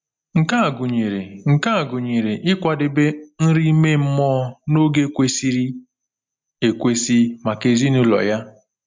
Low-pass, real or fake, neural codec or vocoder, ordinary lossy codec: 7.2 kHz; real; none; MP3, 64 kbps